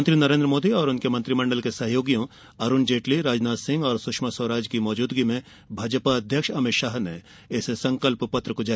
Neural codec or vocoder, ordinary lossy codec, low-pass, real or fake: none; none; none; real